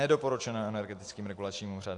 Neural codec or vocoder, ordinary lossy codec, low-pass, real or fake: none; AAC, 48 kbps; 10.8 kHz; real